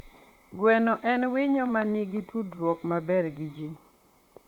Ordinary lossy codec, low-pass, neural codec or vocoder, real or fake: Opus, 64 kbps; 19.8 kHz; vocoder, 44.1 kHz, 128 mel bands, Pupu-Vocoder; fake